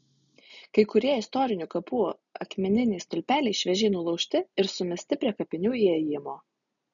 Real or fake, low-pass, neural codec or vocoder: real; 7.2 kHz; none